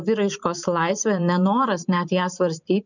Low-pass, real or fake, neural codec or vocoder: 7.2 kHz; fake; vocoder, 44.1 kHz, 128 mel bands every 512 samples, BigVGAN v2